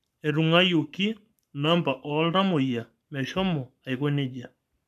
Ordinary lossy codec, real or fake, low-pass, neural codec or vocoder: none; fake; 14.4 kHz; codec, 44.1 kHz, 7.8 kbps, Pupu-Codec